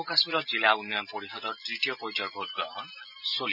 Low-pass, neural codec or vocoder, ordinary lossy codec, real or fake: 5.4 kHz; none; none; real